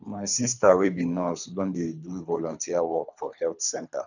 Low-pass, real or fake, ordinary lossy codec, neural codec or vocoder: 7.2 kHz; fake; none; codec, 24 kHz, 3 kbps, HILCodec